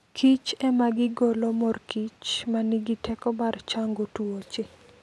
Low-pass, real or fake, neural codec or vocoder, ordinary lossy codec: none; real; none; none